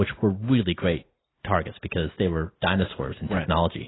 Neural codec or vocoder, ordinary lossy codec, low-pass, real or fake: none; AAC, 16 kbps; 7.2 kHz; real